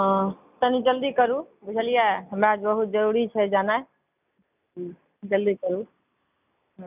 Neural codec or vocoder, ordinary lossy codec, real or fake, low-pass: none; none; real; 3.6 kHz